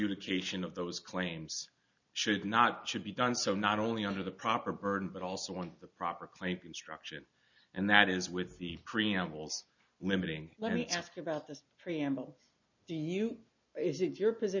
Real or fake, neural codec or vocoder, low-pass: real; none; 7.2 kHz